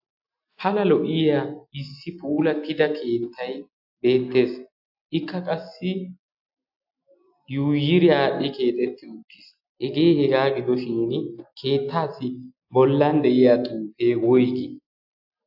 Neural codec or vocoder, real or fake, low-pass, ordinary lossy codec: none; real; 5.4 kHz; AAC, 48 kbps